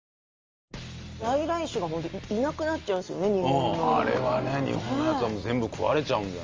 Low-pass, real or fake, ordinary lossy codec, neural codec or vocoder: 7.2 kHz; real; Opus, 32 kbps; none